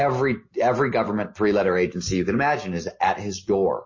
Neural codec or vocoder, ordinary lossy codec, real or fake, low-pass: none; MP3, 32 kbps; real; 7.2 kHz